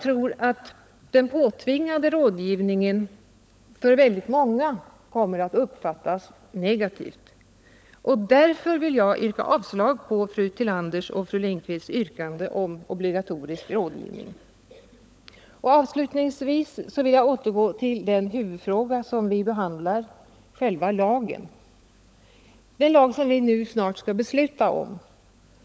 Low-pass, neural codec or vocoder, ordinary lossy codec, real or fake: none; codec, 16 kHz, 16 kbps, FunCodec, trained on LibriTTS, 50 frames a second; none; fake